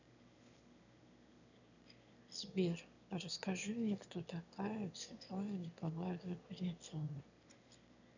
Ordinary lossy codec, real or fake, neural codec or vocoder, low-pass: none; fake; autoencoder, 22.05 kHz, a latent of 192 numbers a frame, VITS, trained on one speaker; 7.2 kHz